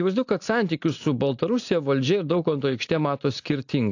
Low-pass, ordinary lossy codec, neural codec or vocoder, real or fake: 7.2 kHz; AAC, 48 kbps; none; real